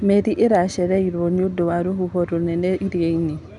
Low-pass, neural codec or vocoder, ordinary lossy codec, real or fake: 10.8 kHz; none; none; real